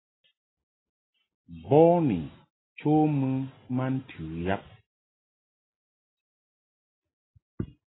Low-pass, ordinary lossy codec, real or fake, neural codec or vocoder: 7.2 kHz; AAC, 16 kbps; real; none